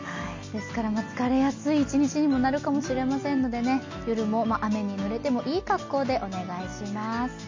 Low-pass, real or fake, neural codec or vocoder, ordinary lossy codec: 7.2 kHz; real; none; MP3, 48 kbps